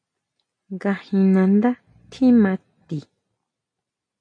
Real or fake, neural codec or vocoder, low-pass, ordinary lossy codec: real; none; 9.9 kHz; MP3, 48 kbps